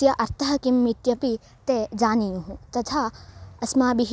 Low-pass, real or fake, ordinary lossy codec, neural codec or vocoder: none; real; none; none